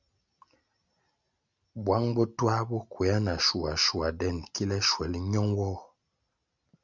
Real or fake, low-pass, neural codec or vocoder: real; 7.2 kHz; none